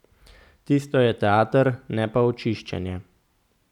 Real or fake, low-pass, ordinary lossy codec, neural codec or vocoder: real; 19.8 kHz; none; none